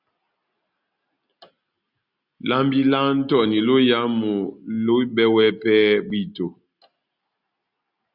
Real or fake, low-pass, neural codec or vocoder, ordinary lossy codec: real; 5.4 kHz; none; Opus, 64 kbps